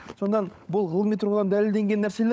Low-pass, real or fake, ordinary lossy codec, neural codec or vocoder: none; fake; none; codec, 16 kHz, 16 kbps, FunCodec, trained on LibriTTS, 50 frames a second